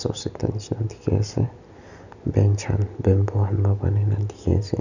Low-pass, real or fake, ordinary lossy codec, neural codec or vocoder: 7.2 kHz; real; none; none